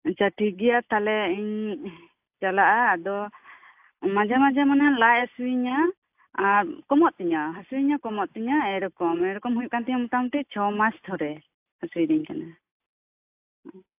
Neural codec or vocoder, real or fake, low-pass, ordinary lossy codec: none; real; 3.6 kHz; AAC, 32 kbps